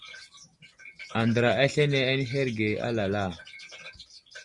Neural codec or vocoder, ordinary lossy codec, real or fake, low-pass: none; Opus, 64 kbps; real; 10.8 kHz